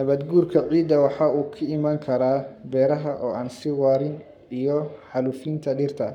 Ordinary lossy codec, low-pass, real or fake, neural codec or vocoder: none; 19.8 kHz; fake; codec, 44.1 kHz, 7.8 kbps, Pupu-Codec